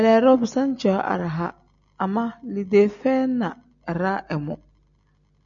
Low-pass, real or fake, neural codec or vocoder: 7.2 kHz; real; none